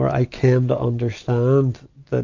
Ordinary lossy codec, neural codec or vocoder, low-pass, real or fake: AAC, 48 kbps; none; 7.2 kHz; real